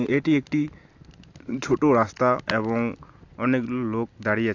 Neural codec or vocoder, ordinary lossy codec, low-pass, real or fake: none; AAC, 48 kbps; 7.2 kHz; real